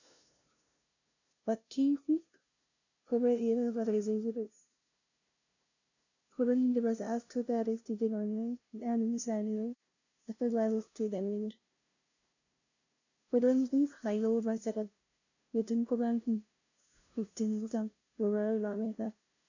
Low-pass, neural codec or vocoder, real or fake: 7.2 kHz; codec, 16 kHz, 0.5 kbps, FunCodec, trained on LibriTTS, 25 frames a second; fake